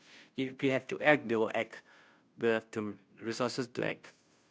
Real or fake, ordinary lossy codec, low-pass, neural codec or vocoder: fake; none; none; codec, 16 kHz, 0.5 kbps, FunCodec, trained on Chinese and English, 25 frames a second